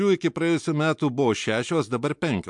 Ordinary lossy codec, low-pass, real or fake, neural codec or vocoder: MP3, 64 kbps; 10.8 kHz; real; none